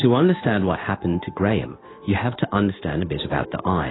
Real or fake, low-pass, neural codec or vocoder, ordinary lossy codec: fake; 7.2 kHz; codec, 16 kHz in and 24 kHz out, 1 kbps, XY-Tokenizer; AAC, 16 kbps